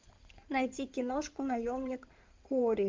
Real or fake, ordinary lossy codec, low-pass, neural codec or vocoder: fake; Opus, 32 kbps; 7.2 kHz; codec, 16 kHz in and 24 kHz out, 2.2 kbps, FireRedTTS-2 codec